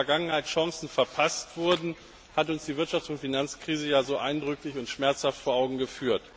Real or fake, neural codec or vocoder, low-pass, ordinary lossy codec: real; none; none; none